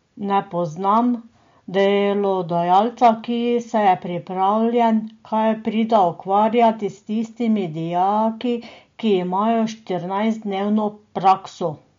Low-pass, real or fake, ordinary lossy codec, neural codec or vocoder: 7.2 kHz; real; MP3, 48 kbps; none